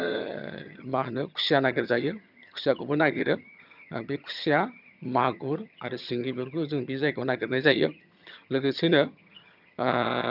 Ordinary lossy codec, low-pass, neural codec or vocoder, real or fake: none; 5.4 kHz; vocoder, 22.05 kHz, 80 mel bands, HiFi-GAN; fake